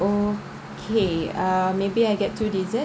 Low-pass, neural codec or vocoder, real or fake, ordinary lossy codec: none; none; real; none